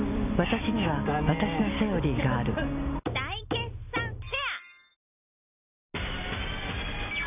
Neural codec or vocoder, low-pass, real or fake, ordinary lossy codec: none; 3.6 kHz; real; none